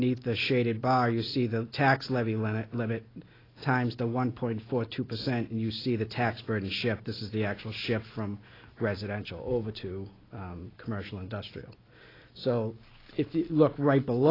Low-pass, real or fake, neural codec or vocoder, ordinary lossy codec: 5.4 kHz; real; none; AAC, 24 kbps